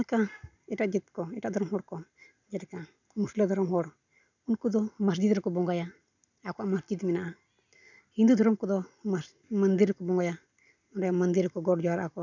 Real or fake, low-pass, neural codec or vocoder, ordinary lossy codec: real; 7.2 kHz; none; none